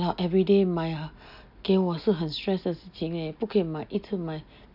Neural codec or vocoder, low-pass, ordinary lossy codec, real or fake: none; 5.4 kHz; none; real